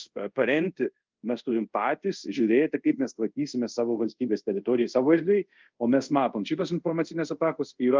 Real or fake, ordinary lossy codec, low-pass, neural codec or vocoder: fake; Opus, 24 kbps; 7.2 kHz; codec, 24 kHz, 0.5 kbps, DualCodec